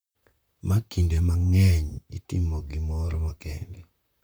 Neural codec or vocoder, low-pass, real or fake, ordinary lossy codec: vocoder, 44.1 kHz, 128 mel bands, Pupu-Vocoder; none; fake; none